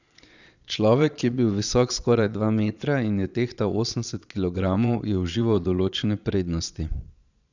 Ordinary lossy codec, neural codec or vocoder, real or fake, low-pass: none; vocoder, 22.05 kHz, 80 mel bands, Vocos; fake; 7.2 kHz